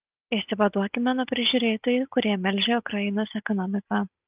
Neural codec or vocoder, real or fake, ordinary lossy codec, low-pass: none; real; Opus, 32 kbps; 3.6 kHz